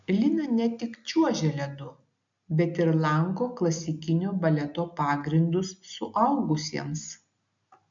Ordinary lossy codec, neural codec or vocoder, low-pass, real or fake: MP3, 64 kbps; none; 7.2 kHz; real